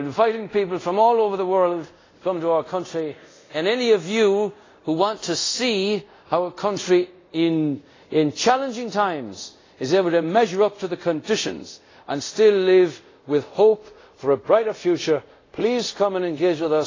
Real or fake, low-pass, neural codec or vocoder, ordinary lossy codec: fake; 7.2 kHz; codec, 24 kHz, 0.5 kbps, DualCodec; AAC, 32 kbps